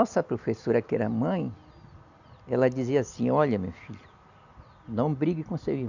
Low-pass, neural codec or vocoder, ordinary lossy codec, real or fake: 7.2 kHz; none; none; real